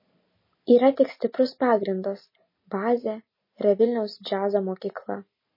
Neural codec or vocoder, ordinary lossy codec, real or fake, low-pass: none; MP3, 24 kbps; real; 5.4 kHz